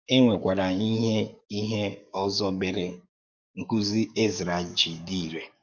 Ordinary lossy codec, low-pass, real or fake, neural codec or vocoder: none; 7.2 kHz; fake; codec, 44.1 kHz, 7.8 kbps, DAC